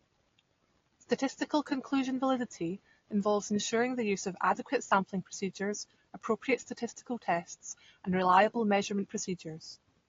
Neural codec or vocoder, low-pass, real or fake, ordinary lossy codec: none; 7.2 kHz; real; AAC, 32 kbps